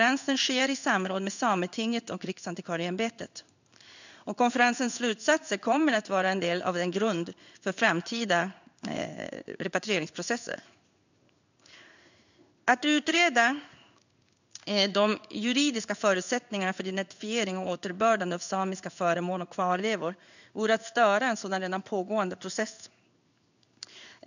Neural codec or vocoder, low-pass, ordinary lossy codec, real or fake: codec, 16 kHz in and 24 kHz out, 1 kbps, XY-Tokenizer; 7.2 kHz; none; fake